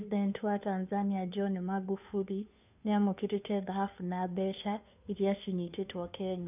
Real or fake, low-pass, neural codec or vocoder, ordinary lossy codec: fake; 3.6 kHz; codec, 16 kHz, 2 kbps, FunCodec, trained on Chinese and English, 25 frames a second; none